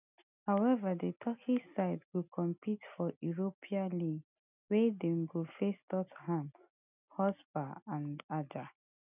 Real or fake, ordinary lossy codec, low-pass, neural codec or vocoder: real; none; 3.6 kHz; none